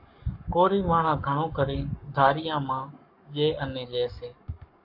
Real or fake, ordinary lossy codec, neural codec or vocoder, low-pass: fake; AAC, 48 kbps; codec, 44.1 kHz, 7.8 kbps, Pupu-Codec; 5.4 kHz